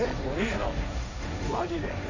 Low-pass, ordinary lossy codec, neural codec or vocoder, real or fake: 7.2 kHz; none; codec, 16 kHz, 1.1 kbps, Voila-Tokenizer; fake